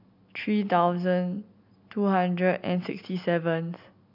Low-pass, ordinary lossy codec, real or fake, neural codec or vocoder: 5.4 kHz; none; real; none